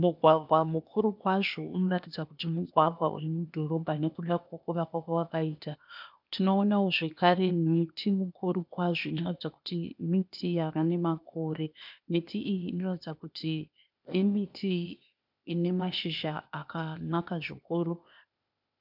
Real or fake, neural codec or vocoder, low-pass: fake; codec, 16 kHz, 0.8 kbps, ZipCodec; 5.4 kHz